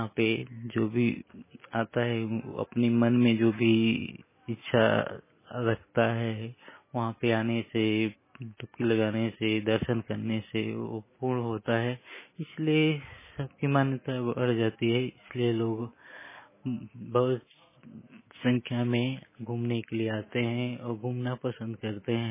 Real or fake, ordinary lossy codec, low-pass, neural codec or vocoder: real; MP3, 16 kbps; 3.6 kHz; none